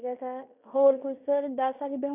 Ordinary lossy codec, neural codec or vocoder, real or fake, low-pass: none; codec, 16 kHz in and 24 kHz out, 0.9 kbps, LongCat-Audio-Codec, fine tuned four codebook decoder; fake; 3.6 kHz